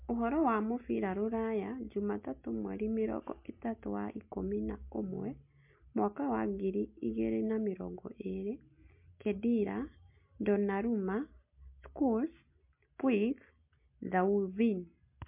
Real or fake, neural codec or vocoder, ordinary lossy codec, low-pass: real; none; MP3, 32 kbps; 3.6 kHz